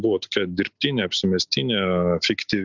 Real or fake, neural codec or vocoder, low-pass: real; none; 7.2 kHz